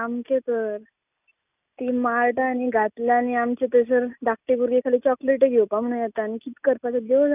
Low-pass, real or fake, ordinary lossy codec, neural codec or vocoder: 3.6 kHz; real; none; none